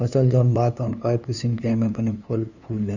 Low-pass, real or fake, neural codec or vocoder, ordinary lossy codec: 7.2 kHz; fake; codec, 16 kHz, 2 kbps, FunCodec, trained on LibriTTS, 25 frames a second; Opus, 64 kbps